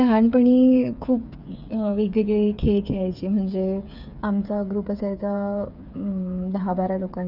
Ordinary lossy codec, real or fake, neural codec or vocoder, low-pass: none; fake; codec, 16 kHz, 8 kbps, FreqCodec, smaller model; 5.4 kHz